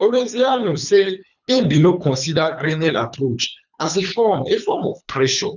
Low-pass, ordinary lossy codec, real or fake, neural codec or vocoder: 7.2 kHz; none; fake; codec, 24 kHz, 3 kbps, HILCodec